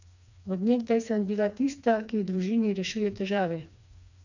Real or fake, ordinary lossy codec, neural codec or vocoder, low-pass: fake; none; codec, 16 kHz, 2 kbps, FreqCodec, smaller model; 7.2 kHz